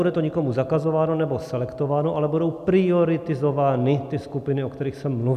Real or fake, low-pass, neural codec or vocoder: real; 14.4 kHz; none